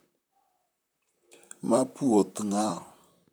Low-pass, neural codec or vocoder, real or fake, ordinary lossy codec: none; vocoder, 44.1 kHz, 128 mel bands, Pupu-Vocoder; fake; none